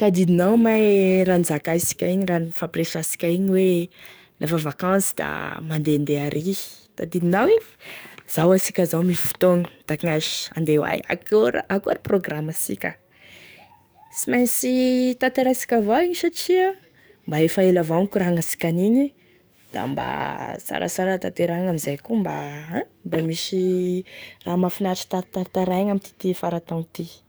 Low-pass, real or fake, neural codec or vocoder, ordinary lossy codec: none; fake; codec, 44.1 kHz, 7.8 kbps, DAC; none